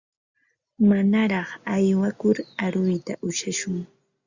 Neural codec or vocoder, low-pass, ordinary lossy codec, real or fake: none; 7.2 kHz; Opus, 64 kbps; real